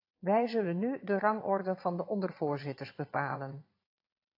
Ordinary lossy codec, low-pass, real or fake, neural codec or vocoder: AAC, 32 kbps; 5.4 kHz; fake; vocoder, 22.05 kHz, 80 mel bands, Vocos